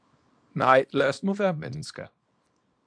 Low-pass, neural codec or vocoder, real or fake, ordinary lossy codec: 9.9 kHz; codec, 24 kHz, 0.9 kbps, WavTokenizer, small release; fake; AAC, 64 kbps